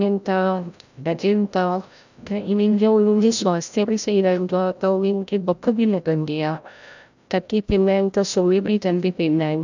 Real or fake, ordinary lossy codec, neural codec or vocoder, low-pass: fake; none; codec, 16 kHz, 0.5 kbps, FreqCodec, larger model; 7.2 kHz